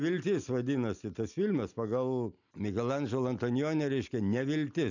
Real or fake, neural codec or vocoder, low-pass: real; none; 7.2 kHz